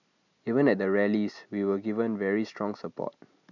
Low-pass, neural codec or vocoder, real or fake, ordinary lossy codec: 7.2 kHz; none; real; none